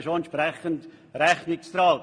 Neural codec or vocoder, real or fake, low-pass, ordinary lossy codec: none; real; 9.9 kHz; MP3, 48 kbps